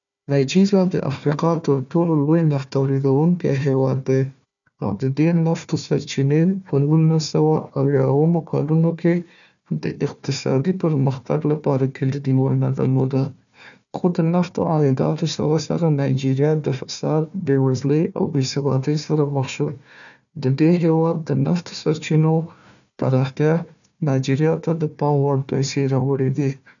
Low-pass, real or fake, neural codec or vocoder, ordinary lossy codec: 7.2 kHz; fake; codec, 16 kHz, 1 kbps, FunCodec, trained on Chinese and English, 50 frames a second; none